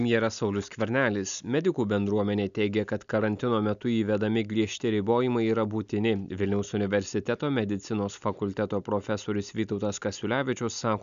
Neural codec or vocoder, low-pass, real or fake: none; 7.2 kHz; real